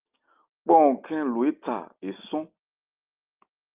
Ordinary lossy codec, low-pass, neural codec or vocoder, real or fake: Opus, 24 kbps; 3.6 kHz; none; real